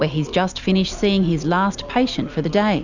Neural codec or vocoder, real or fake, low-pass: none; real; 7.2 kHz